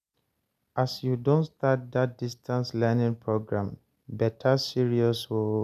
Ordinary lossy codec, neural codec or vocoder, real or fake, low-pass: none; none; real; 14.4 kHz